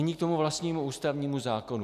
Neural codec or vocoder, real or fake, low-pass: none; real; 14.4 kHz